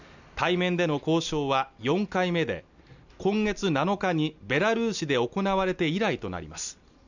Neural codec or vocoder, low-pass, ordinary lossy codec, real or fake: none; 7.2 kHz; none; real